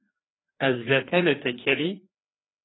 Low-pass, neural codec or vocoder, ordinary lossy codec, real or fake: 7.2 kHz; codec, 16 kHz, 2 kbps, FreqCodec, larger model; AAC, 16 kbps; fake